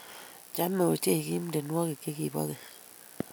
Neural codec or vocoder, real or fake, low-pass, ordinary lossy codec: none; real; none; none